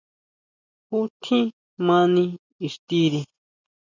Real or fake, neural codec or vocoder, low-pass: real; none; 7.2 kHz